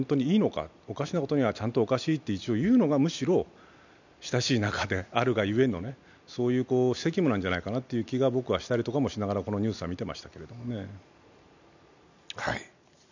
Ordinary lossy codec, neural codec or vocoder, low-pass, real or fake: none; none; 7.2 kHz; real